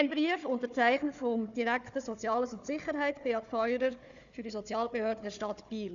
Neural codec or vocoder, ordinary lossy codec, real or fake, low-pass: codec, 16 kHz, 4 kbps, FunCodec, trained on Chinese and English, 50 frames a second; none; fake; 7.2 kHz